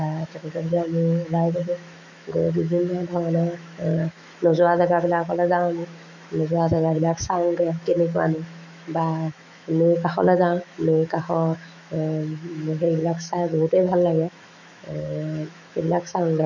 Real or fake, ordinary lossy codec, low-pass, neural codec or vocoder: fake; none; 7.2 kHz; autoencoder, 48 kHz, 128 numbers a frame, DAC-VAE, trained on Japanese speech